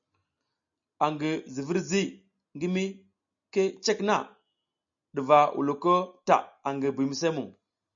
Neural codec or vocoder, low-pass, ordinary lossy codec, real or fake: none; 7.2 kHz; MP3, 64 kbps; real